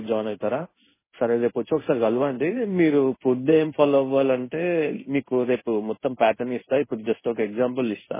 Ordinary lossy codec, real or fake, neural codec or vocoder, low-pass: MP3, 16 kbps; fake; codec, 16 kHz in and 24 kHz out, 1 kbps, XY-Tokenizer; 3.6 kHz